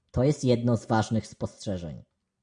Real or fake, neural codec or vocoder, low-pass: real; none; 9.9 kHz